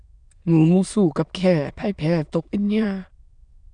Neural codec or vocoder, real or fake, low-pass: autoencoder, 22.05 kHz, a latent of 192 numbers a frame, VITS, trained on many speakers; fake; 9.9 kHz